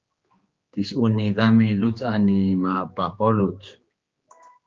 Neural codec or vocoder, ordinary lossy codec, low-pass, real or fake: codec, 16 kHz, 2 kbps, X-Codec, HuBERT features, trained on general audio; Opus, 24 kbps; 7.2 kHz; fake